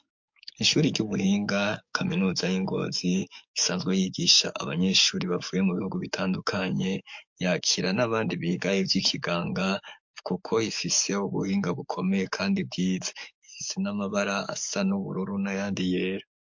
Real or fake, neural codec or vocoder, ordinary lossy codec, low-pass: fake; codec, 44.1 kHz, 7.8 kbps, DAC; MP3, 48 kbps; 7.2 kHz